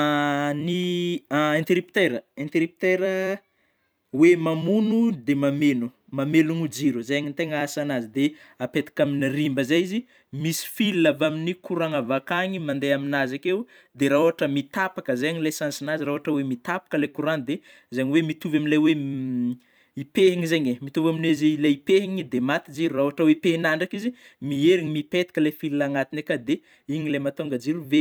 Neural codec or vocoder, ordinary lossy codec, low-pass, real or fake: vocoder, 44.1 kHz, 128 mel bands every 256 samples, BigVGAN v2; none; none; fake